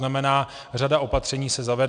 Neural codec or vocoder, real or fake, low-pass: none; real; 9.9 kHz